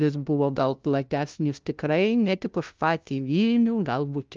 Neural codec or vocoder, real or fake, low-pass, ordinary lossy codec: codec, 16 kHz, 0.5 kbps, FunCodec, trained on LibriTTS, 25 frames a second; fake; 7.2 kHz; Opus, 24 kbps